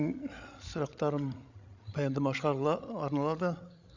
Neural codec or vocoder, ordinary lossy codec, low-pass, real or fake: codec, 16 kHz, 16 kbps, FreqCodec, larger model; Opus, 64 kbps; 7.2 kHz; fake